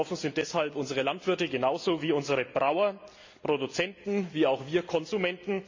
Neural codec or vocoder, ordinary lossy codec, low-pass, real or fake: none; MP3, 64 kbps; 7.2 kHz; real